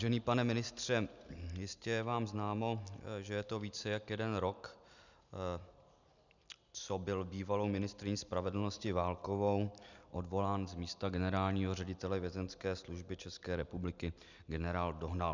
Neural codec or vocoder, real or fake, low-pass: none; real; 7.2 kHz